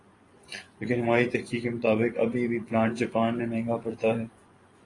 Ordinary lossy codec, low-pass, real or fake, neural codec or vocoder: AAC, 32 kbps; 10.8 kHz; fake; vocoder, 24 kHz, 100 mel bands, Vocos